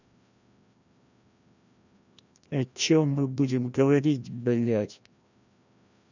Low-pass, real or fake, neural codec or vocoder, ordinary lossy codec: 7.2 kHz; fake; codec, 16 kHz, 1 kbps, FreqCodec, larger model; none